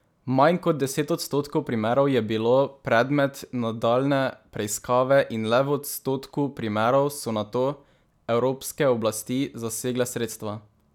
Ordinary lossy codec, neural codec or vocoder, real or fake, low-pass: none; none; real; 19.8 kHz